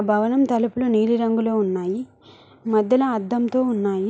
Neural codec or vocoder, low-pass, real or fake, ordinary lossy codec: none; none; real; none